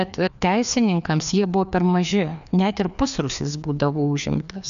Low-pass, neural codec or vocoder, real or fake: 7.2 kHz; codec, 16 kHz, 2 kbps, FreqCodec, larger model; fake